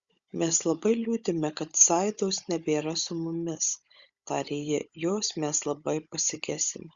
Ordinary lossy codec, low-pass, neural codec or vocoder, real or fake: Opus, 64 kbps; 7.2 kHz; codec, 16 kHz, 16 kbps, FunCodec, trained on Chinese and English, 50 frames a second; fake